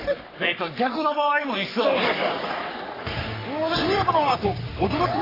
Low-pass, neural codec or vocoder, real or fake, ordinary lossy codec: 5.4 kHz; codec, 44.1 kHz, 3.4 kbps, Pupu-Codec; fake; AAC, 24 kbps